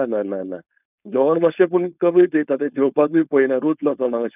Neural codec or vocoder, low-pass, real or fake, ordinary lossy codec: codec, 16 kHz, 4.8 kbps, FACodec; 3.6 kHz; fake; none